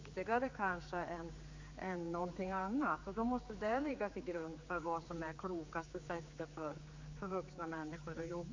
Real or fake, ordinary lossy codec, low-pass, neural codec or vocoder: fake; MP3, 48 kbps; 7.2 kHz; codec, 16 kHz, 4 kbps, X-Codec, HuBERT features, trained on general audio